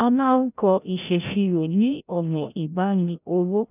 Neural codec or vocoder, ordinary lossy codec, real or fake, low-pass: codec, 16 kHz, 0.5 kbps, FreqCodec, larger model; none; fake; 3.6 kHz